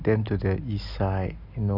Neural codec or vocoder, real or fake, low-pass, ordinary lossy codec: none; real; 5.4 kHz; none